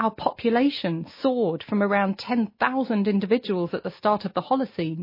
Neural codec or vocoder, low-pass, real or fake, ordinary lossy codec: none; 5.4 kHz; real; MP3, 24 kbps